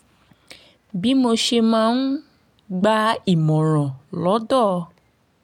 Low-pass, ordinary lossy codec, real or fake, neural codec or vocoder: 19.8 kHz; MP3, 96 kbps; real; none